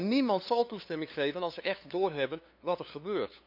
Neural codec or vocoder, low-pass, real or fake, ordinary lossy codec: codec, 16 kHz, 2 kbps, FunCodec, trained on LibriTTS, 25 frames a second; 5.4 kHz; fake; none